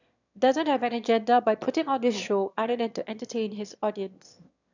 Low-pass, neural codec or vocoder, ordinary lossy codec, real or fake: 7.2 kHz; autoencoder, 22.05 kHz, a latent of 192 numbers a frame, VITS, trained on one speaker; none; fake